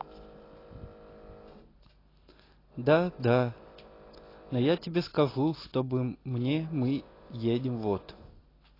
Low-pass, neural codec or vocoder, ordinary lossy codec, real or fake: 5.4 kHz; none; AAC, 24 kbps; real